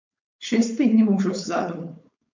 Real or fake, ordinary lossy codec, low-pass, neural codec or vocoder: fake; MP3, 64 kbps; 7.2 kHz; codec, 16 kHz, 4.8 kbps, FACodec